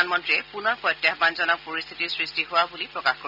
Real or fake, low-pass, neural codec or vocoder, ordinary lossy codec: real; 5.4 kHz; none; none